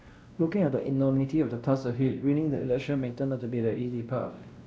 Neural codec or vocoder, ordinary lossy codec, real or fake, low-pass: codec, 16 kHz, 1 kbps, X-Codec, WavLM features, trained on Multilingual LibriSpeech; none; fake; none